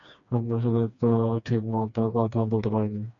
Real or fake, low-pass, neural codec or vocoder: fake; 7.2 kHz; codec, 16 kHz, 2 kbps, FreqCodec, smaller model